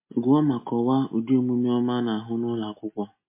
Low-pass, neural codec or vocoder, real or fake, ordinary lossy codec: 3.6 kHz; none; real; MP3, 24 kbps